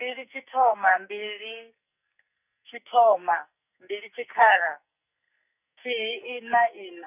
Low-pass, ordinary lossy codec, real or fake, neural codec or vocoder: 3.6 kHz; none; fake; codec, 44.1 kHz, 2.6 kbps, SNAC